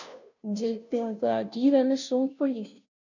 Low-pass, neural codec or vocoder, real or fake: 7.2 kHz; codec, 16 kHz, 0.5 kbps, FunCodec, trained on Chinese and English, 25 frames a second; fake